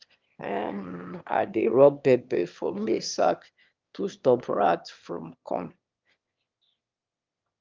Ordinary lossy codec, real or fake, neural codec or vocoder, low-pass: Opus, 24 kbps; fake; autoencoder, 22.05 kHz, a latent of 192 numbers a frame, VITS, trained on one speaker; 7.2 kHz